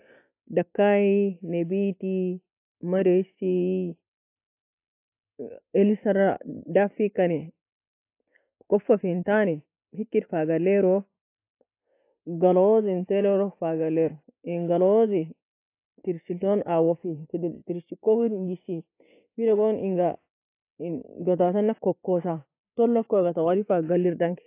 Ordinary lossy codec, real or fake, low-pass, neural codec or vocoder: AAC, 24 kbps; real; 3.6 kHz; none